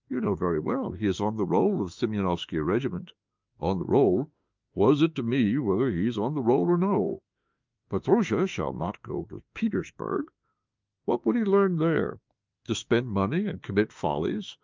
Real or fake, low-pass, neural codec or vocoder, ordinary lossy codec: fake; 7.2 kHz; autoencoder, 48 kHz, 32 numbers a frame, DAC-VAE, trained on Japanese speech; Opus, 24 kbps